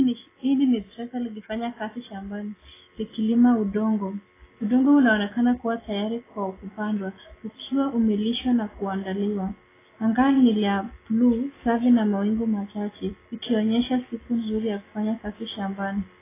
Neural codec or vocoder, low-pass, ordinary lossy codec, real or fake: none; 3.6 kHz; AAC, 16 kbps; real